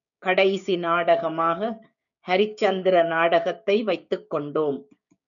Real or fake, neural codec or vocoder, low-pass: fake; codec, 16 kHz, 6 kbps, DAC; 7.2 kHz